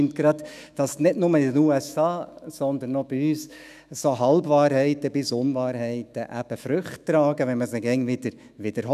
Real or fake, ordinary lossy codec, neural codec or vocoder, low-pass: fake; none; autoencoder, 48 kHz, 128 numbers a frame, DAC-VAE, trained on Japanese speech; 14.4 kHz